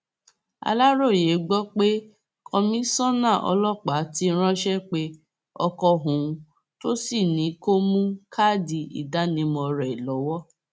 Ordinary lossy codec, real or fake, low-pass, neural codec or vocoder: none; real; none; none